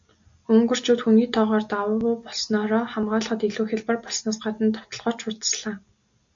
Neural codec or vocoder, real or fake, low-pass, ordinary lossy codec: none; real; 7.2 kHz; AAC, 64 kbps